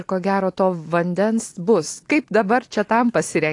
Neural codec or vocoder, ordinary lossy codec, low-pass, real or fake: none; AAC, 48 kbps; 10.8 kHz; real